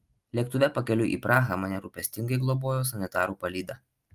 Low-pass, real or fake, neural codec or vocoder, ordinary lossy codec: 14.4 kHz; real; none; Opus, 32 kbps